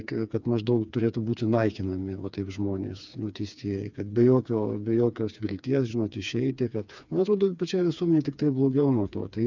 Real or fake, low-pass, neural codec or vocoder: fake; 7.2 kHz; codec, 16 kHz, 4 kbps, FreqCodec, smaller model